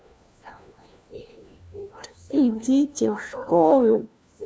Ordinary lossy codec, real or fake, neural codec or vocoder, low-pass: none; fake; codec, 16 kHz, 1 kbps, FunCodec, trained on LibriTTS, 50 frames a second; none